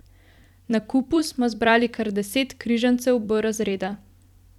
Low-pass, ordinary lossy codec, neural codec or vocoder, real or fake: 19.8 kHz; none; vocoder, 44.1 kHz, 128 mel bands every 256 samples, BigVGAN v2; fake